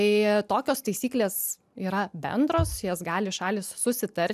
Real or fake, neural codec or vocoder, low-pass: real; none; 14.4 kHz